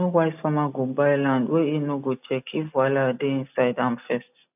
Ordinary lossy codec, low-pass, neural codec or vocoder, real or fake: none; 3.6 kHz; none; real